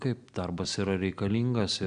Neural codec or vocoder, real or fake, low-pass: none; real; 9.9 kHz